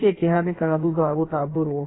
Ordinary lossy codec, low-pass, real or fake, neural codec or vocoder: AAC, 16 kbps; 7.2 kHz; fake; codec, 16 kHz in and 24 kHz out, 1.1 kbps, FireRedTTS-2 codec